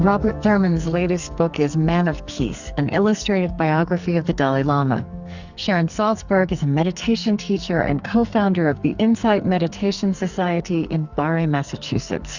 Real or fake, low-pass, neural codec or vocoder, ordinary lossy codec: fake; 7.2 kHz; codec, 44.1 kHz, 2.6 kbps, SNAC; Opus, 64 kbps